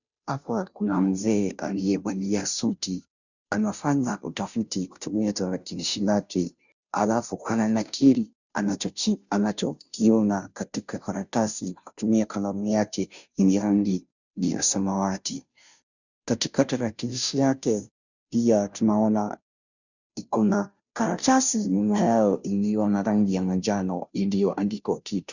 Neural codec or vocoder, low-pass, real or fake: codec, 16 kHz, 0.5 kbps, FunCodec, trained on Chinese and English, 25 frames a second; 7.2 kHz; fake